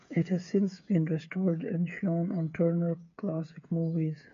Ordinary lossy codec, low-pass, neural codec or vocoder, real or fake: AAC, 64 kbps; 7.2 kHz; codec, 16 kHz, 8 kbps, FreqCodec, smaller model; fake